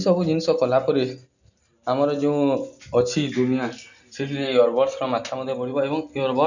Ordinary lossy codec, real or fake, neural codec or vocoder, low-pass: none; real; none; 7.2 kHz